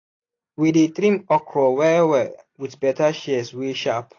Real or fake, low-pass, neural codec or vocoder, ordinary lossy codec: real; 7.2 kHz; none; AAC, 32 kbps